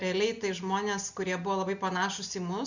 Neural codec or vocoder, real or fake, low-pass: none; real; 7.2 kHz